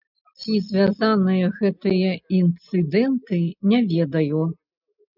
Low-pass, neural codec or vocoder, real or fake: 5.4 kHz; none; real